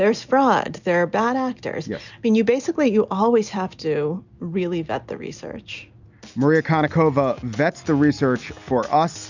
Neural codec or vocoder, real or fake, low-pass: none; real; 7.2 kHz